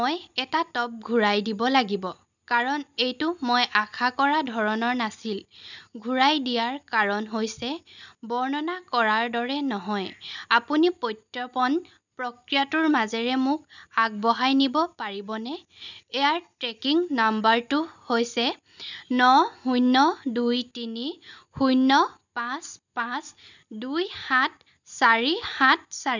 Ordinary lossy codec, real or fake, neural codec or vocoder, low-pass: none; real; none; 7.2 kHz